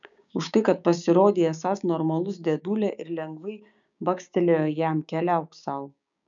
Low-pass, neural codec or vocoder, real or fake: 7.2 kHz; codec, 16 kHz, 6 kbps, DAC; fake